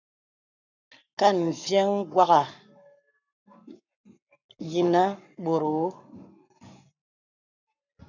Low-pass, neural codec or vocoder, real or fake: 7.2 kHz; codec, 44.1 kHz, 7.8 kbps, Pupu-Codec; fake